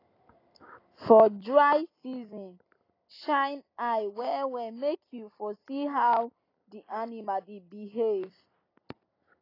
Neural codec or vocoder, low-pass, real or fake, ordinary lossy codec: none; 5.4 kHz; real; AAC, 24 kbps